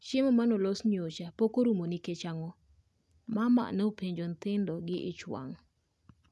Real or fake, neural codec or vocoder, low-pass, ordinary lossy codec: real; none; none; none